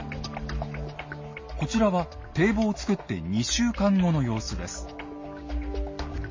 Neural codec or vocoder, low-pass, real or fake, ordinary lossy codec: none; 7.2 kHz; real; MP3, 32 kbps